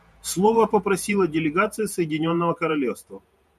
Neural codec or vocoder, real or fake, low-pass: vocoder, 48 kHz, 128 mel bands, Vocos; fake; 14.4 kHz